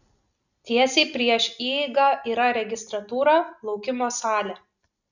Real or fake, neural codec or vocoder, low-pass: real; none; 7.2 kHz